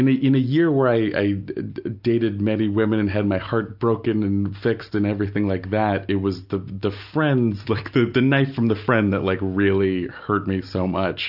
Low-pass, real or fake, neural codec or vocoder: 5.4 kHz; real; none